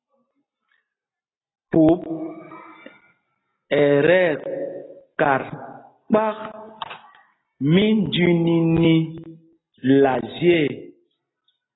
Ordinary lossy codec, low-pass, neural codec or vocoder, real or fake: AAC, 16 kbps; 7.2 kHz; none; real